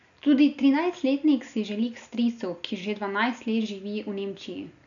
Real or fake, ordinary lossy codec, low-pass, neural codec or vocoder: real; none; 7.2 kHz; none